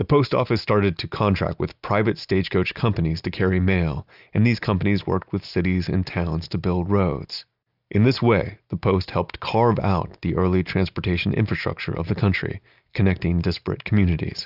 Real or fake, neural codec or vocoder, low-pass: real; none; 5.4 kHz